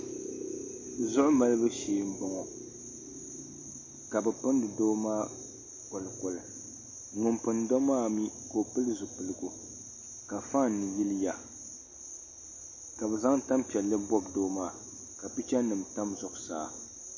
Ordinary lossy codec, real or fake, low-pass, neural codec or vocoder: MP3, 32 kbps; real; 7.2 kHz; none